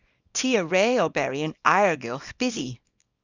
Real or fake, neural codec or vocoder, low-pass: fake; codec, 24 kHz, 0.9 kbps, WavTokenizer, small release; 7.2 kHz